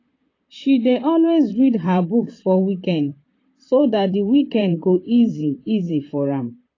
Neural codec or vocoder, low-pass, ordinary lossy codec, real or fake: vocoder, 44.1 kHz, 128 mel bands, Pupu-Vocoder; 7.2 kHz; AAC, 32 kbps; fake